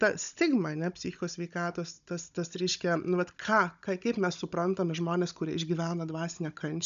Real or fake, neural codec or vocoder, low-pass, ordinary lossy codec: fake; codec, 16 kHz, 16 kbps, FunCodec, trained on Chinese and English, 50 frames a second; 7.2 kHz; MP3, 96 kbps